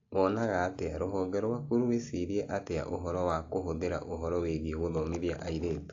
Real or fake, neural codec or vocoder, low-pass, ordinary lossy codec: real; none; 7.2 kHz; AAC, 48 kbps